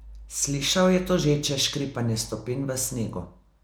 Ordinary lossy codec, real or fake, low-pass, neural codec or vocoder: none; real; none; none